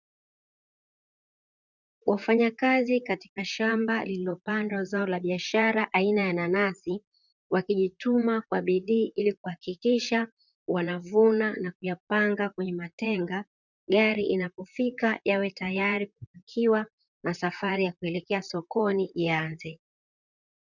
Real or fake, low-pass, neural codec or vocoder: fake; 7.2 kHz; vocoder, 44.1 kHz, 128 mel bands, Pupu-Vocoder